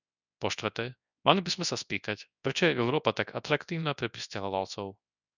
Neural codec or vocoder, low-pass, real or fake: codec, 24 kHz, 0.9 kbps, WavTokenizer, large speech release; 7.2 kHz; fake